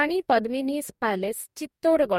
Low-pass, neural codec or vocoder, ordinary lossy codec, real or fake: 19.8 kHz; codec, 44.1 kHz, 2.6 kbps, DAC; MP3, 64 kbps; fake